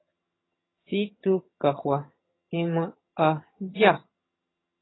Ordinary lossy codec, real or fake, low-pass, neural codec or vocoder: AAC, 16 kbps; fake; 7.2 kHz; vocoder, 22.05 kHz, 80 mel bands, HiFi-GAN